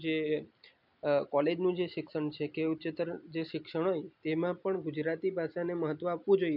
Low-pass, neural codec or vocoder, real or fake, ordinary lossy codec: 5.4 kHz; none; real; none